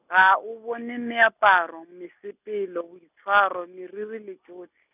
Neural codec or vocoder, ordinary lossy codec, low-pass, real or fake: none; none; 3.6 kHz; real